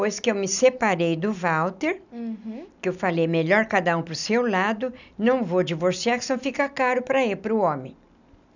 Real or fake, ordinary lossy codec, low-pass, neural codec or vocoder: real; none; 7.2 kHz; none